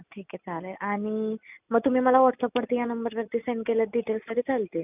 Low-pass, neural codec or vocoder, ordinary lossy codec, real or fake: 3.6 kHz; none; none; real